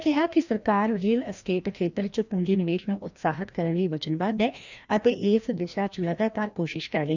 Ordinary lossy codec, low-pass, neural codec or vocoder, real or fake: none; 7.2 kHz; codec, 16 kHz, 1 kbps, FreqCodec, larger model; fake